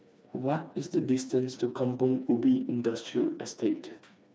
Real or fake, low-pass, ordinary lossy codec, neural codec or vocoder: fake; none; none; codec, 16 kHz, 2 kbps, FreqCodec, smaller model